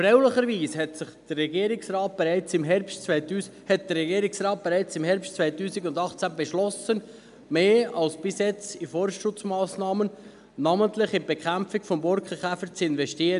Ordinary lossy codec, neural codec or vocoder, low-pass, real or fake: none; none; 10.8 kHz; real